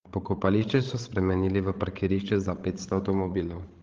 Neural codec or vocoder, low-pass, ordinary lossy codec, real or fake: codec, 16 kHz, 16 kbps, FreqCodec, larger model; 7.2 kHz; Opus, 16 kbps; fake